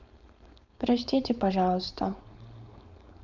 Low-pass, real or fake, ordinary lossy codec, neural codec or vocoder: 7.2 kHz; fake; none; codec, 16 kHz, 4.8 kbps, FACodec